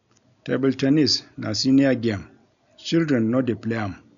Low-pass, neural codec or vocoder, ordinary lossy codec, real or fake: 7.2 kHz; none; none; real